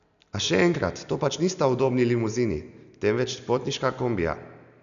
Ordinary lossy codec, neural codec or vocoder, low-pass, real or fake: none; none; 7.2 kHz; real